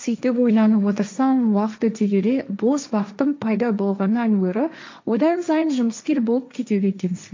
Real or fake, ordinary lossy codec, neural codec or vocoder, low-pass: fake; MP3, 64 kbps; codec, 16 kHz, 1.1 kbps, Voila-Tokenizer; 7.2 kHz